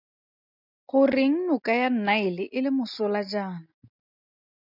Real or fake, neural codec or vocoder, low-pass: real; none; 5.4 kHz